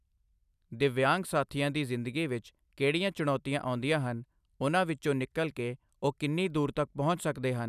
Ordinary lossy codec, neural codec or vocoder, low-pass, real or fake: none; none; 14.4 kHz; real